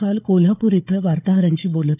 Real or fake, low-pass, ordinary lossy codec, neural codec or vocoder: fake; 3.6 kHz; none; codec, 16 kHz, 4 kbps, FunCodec, trained on Chinese and English, 50 frames a second